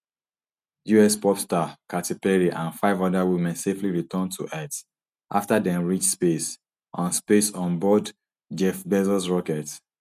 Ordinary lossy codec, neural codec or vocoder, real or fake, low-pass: none; none; real; 14.4 kHz